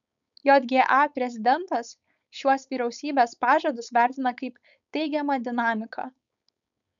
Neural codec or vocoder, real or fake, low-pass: codec, 16 kHz, 4.8 kbps, FACodec; fake; 7.2 kHz